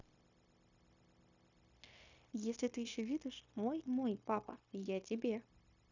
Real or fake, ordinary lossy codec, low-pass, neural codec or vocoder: fake; none; 7.2 kHz; codec, 16 kHz, 0.9 kbps, LongCat-Audio-Codec